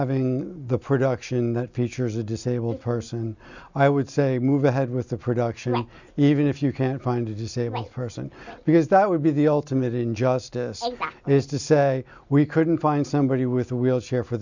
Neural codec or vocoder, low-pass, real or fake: none; 7.2 kHz; real